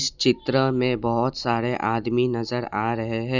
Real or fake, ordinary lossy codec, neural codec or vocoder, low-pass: real; none; none; 7.2 kHz